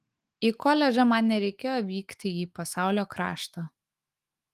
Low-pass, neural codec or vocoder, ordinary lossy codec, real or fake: 14.4 kHz; autoencoder, 48 kHz, 128 numbers a frame, DAC-VAE, trained on Japanese speech; Opus, 24 kbps; fake